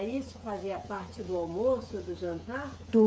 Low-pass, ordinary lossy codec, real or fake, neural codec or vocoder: none; none; fake; codec, 16 kHz, 8 kbps, FreqCodec, smaller model